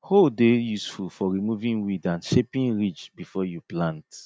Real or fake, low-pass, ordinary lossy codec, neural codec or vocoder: real; none; none; none